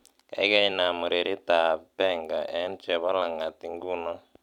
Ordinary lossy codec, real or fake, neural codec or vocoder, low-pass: none; fake; vocoder, 44.1 kHz, 128 mel bands every 256 samples, BigVGAN v2; 19.8 kHz